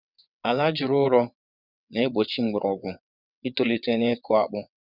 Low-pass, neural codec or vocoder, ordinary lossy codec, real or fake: 5.4 kHz; vocoder, 22.05 kHz, 80 mel bands, WaveNeXt; none; fake